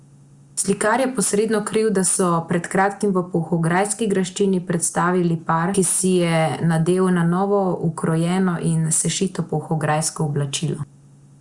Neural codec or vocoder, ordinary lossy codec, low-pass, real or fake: none; Opus, 64 kbps; 10.8 kHz; real